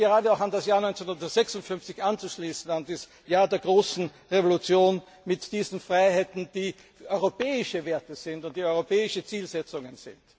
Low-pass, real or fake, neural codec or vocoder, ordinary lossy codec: none; real; none; none